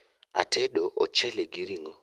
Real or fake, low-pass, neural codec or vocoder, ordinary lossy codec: fake; 14.4 kHz; autoencoder, 48 kHz, 128 numbers a frame, DAC-VAE, trained on Japanese speech; Opus, 24 kbps